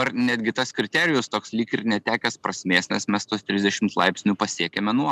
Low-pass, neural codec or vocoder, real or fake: 14.4 kHz; none; real